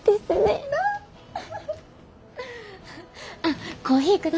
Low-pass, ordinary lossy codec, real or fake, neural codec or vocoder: none; none; real; none